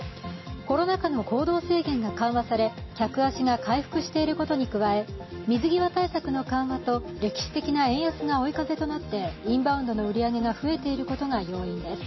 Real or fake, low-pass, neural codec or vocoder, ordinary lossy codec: real; 7.2 kHz; none; MP3, 24 kbps